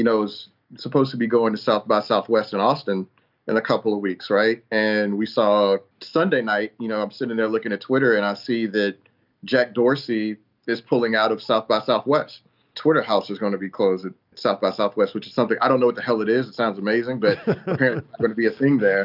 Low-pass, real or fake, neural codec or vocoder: 5.4 kHz; real; none